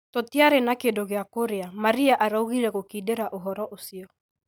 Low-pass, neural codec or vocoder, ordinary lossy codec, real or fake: none; none; none; real